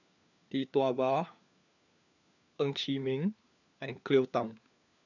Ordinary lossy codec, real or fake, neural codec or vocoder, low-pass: none; fake; codec, 16 kHz, 4 kbps, FunCodec, trained on LibriTTS, 50 frames a second; 7.2 kHz